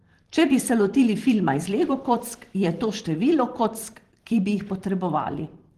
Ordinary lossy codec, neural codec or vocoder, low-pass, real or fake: Opus, 16 kbps; vocoder, 44.1 kHz, 128 mel bands every 512 samples, BigVGAN v2; 14.4 kHz; fake